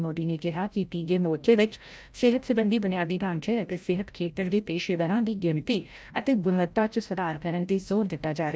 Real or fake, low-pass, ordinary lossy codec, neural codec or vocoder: fake; none; none; codec, 16 kHz, 0.5 kbps, FreqCodec, larger model